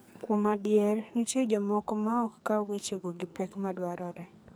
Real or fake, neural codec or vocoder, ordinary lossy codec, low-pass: fake; codec, 44.1 kHz, 2.6 kbps, SNAC; none; none